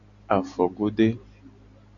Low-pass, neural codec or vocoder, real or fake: 7.2 kHz; none; real